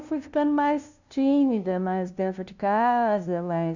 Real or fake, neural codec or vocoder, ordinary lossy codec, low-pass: fake; codec, 16 kHz, 0.5 kbps, FunCodec, trained on LibriTTS, 25 frames a second; none; 7.2 kHz